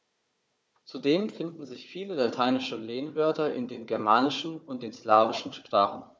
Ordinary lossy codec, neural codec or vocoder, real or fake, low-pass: none; codec, 16 kHz, 4 kbps, FunCodec, trained on Chinese and English, 50 frames a second; fake; none